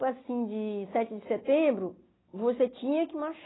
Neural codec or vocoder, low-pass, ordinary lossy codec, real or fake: none; 7.2 kHz; AAC, 16 kbps; real